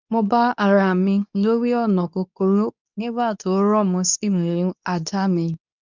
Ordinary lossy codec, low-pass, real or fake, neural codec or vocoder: none; 7.2 kHz; fake; codec, 24 kHz, 0.9 kbps, WavTokenizer, medium speech release version 2